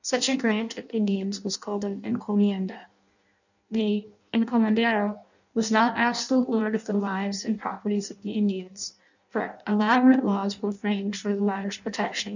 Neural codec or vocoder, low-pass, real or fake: codec, 16 kHz in and 24 kHz out, 0.6 kbps, FireRedTTS-2 codec; 7.2 kHz; fake